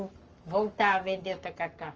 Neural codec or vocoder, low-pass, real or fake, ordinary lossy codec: codec, 44.1 kHz, 7.8 kbps, Pupu-Codec; 7.2 kHz; fake; Opus, 24 kbps